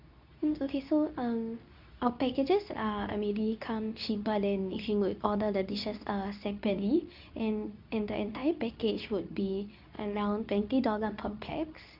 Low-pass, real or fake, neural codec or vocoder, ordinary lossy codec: 5.4 kHz; fake; codec, 24 kHz, 0.9 kbps, WavTokenizer, medium speech release version 2; none